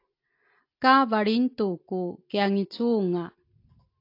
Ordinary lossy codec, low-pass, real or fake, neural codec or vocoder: AAC, 32 kbps; 5.4 kHz; real; none